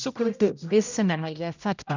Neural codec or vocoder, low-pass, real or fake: codec, 16 kHz, 0.5 kbps, X-Codec, HuBERT features, trained on general audio; 7.2 kHz; fake